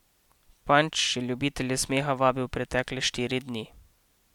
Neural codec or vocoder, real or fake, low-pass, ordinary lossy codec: none; real; 19.8 kHz; MP3, 96 kbps